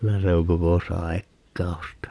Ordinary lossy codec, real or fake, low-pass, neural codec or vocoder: none; fake; none; vocoder, 22.05 kHz, 80 mel bands, Vocos